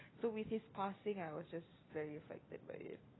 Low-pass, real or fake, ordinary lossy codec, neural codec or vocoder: 7.2 kHz; real; AAC, 16 kbps; none